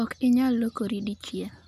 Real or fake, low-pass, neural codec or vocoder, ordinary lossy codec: real; 14.4 kHz; none; none